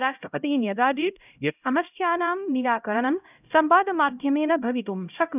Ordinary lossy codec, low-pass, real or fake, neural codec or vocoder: none; 3.6 kHz; fake; codec, 16 kHz, 0.5 kbps, X-Codec, HuBERT features, trained on LibriSpeech